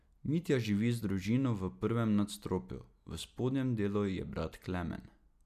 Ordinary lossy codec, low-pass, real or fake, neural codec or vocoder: none; 14.4 kHz; real; none